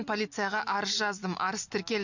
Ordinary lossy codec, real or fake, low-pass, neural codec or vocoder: none; real; 7.2 kHz; none